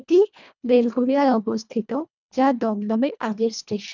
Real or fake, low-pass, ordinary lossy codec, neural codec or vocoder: fake; 7.2 kHz; none; codec, 24 kHz, 1.5 kbps, HILCodec